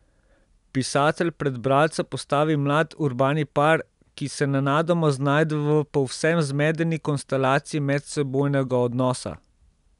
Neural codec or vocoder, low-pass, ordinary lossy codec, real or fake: none; 10.8 kHz; none; real